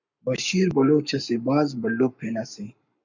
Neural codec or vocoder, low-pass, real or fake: codec, 44.1 kHz, 7.8 kbps, Pupu-Codec; 7.2 kHz; fake